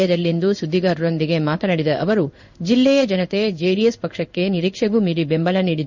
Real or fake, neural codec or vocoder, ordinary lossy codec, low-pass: fake; codec, 16 kHz in and 24 kHz out, 1 kbps, XY-Tokenizer; none; 7.2 kHz